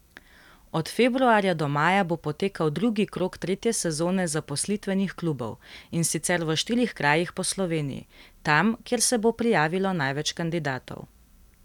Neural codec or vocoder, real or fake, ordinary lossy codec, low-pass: none; real; none; 19.8 kHz